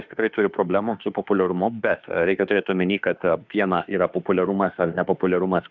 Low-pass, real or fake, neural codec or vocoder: 7.2 kHz; fake; autoencoder, 48 kHz, 32 numbers a frame, DAC-VAE, trained on Japanese speech